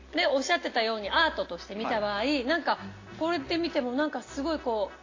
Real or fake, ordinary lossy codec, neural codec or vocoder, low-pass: real; AAC, 32 kbps; none; 7.2 kHz